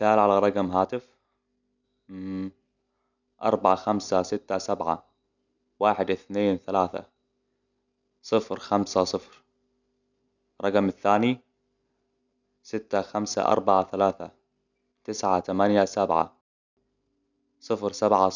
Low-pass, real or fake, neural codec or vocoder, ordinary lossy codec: 7.2 kHz; real; none; none